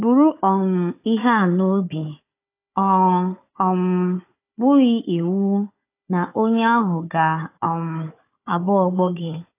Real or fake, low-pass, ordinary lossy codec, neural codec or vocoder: fake; 3.6 kHz; AAC, 24 kbps; codec, 16 kHz, 4 kbps, FunCodec, trained on Chinese and English, 50 frames a second